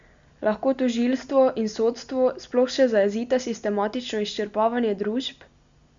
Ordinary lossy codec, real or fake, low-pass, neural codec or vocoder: Opus, 64 kbps; real; 7.2 kHz; none